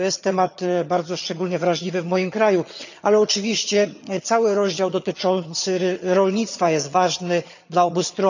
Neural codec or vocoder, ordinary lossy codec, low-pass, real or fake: vocoder, 22.05 kHz, 80 mel bands, HiFi-GAN; none; 7.2 kHz; fake